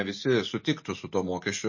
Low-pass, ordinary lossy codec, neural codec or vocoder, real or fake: 7.2 kHz; MP3, 32 kbps; none; real